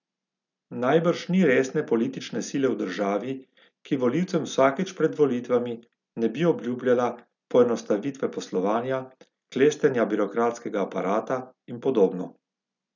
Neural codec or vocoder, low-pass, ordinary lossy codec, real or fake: none; 7.2 kHz; none; real